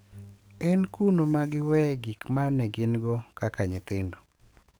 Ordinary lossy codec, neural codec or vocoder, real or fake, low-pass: none; codec, 44.1 kHz, 7.8 kbps, DAC; fake; none